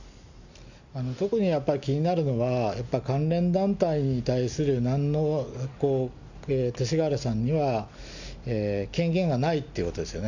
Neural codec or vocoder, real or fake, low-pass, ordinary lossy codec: none; real; 7.2 kHz; none